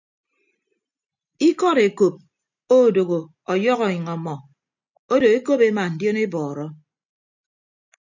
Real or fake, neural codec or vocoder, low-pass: real; none; 7.2 kHz